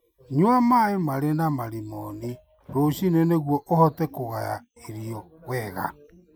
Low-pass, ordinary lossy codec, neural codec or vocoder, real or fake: none; none; none; real